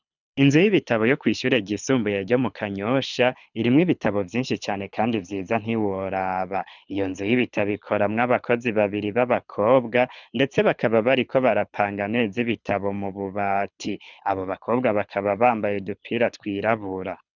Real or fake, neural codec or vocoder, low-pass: fake; codec, 24 kHz, 6 kbps, HILCodec; 7.2 kHz